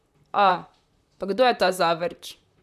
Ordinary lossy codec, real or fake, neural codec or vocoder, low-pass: none; fake; vocoder, 44.1 kHz, 128 mel bands, Pupu-Vocoder; 14.4 kHz